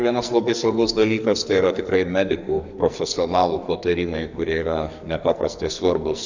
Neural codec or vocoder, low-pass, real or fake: codec, 44.1 kHz, 2.6 kbps, SNAC; 7.2 kHz; fake